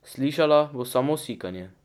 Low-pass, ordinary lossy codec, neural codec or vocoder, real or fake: 19.8 kHz; none; none; real